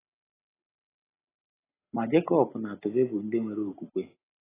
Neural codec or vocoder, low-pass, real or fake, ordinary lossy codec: none; 3.6 kHz; real; AAC, 16 kbps